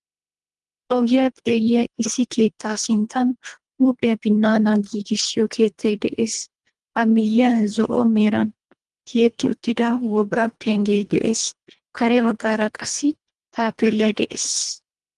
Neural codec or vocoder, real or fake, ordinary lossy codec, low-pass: codec, 24 kHz, 1.5 kbps, HILCodec; fake; Opus, 32 kbps; 10.8 kHz